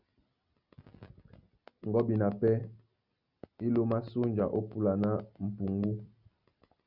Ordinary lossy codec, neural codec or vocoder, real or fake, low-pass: Opus, 64 kbps; none; real; 5.4 kHz